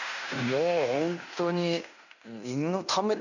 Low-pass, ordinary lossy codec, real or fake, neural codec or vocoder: 7.2 kHz; none; fake; codec, 16 kHz in and 24 kHz out, 0.9 kbps, LongCat-Audio-Codec, fine tuned four codebook decoder